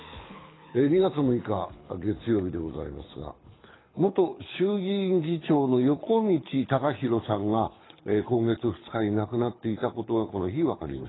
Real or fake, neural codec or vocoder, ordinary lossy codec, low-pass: fake; codec, 24 kHz, 6 kbps, HILCodec; AAC, 16 kbps; 7.2 kHz